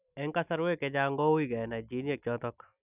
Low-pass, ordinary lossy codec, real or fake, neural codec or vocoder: 3.6 kHz; none; real; none